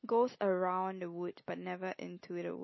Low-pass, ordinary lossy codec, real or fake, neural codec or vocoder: 7.2 kHz; MP3, 24 kbps; real; none